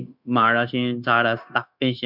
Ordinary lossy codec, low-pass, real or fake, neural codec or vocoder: none; 5.4 kHz; fake; codec, 16 kHz, 0.9 kbps, LongCat-Audio-Codec